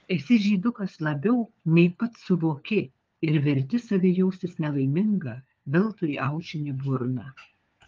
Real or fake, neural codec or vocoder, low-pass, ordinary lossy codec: fake; codec, 16 kHz, 4 kbps, FunCodec, trained on LibriTTS, 50 frames a second; 7.2 kHz; Opus, 32 kbps